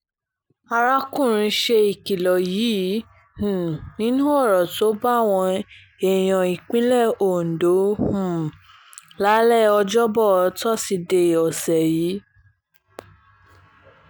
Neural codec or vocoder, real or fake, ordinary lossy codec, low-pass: none; real; none; none